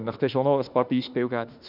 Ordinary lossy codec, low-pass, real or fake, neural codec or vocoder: none; 5.4 kHz; fake; autoencoder, 48 kHz, 32 numbers a frame, DAC-VAE, trained on Japanese speech